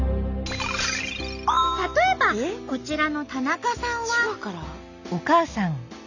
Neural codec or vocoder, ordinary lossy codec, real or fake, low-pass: none; none; real; 7.2 kHz